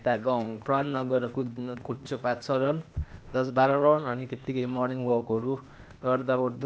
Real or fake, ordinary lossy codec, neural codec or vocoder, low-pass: fake; none; codec, 16 kHz, 0.8 kbps, ZipCodec; none